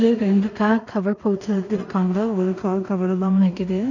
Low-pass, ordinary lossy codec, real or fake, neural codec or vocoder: 7.2 kHz; none; fake; codec, 16 kHz in and 24 kHz out, 0.4 kbps, LongCat-Audio-Codec, two codebook decoder